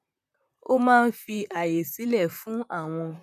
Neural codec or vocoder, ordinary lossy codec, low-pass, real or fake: vocoder, 44.1 kHz, 128 mel bands, Pupu-Vocoder; none; 14.4 kHz; fake